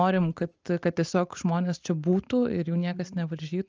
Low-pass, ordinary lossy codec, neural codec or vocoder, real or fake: 7.2 kHz; Opus, 24 kbps; none; real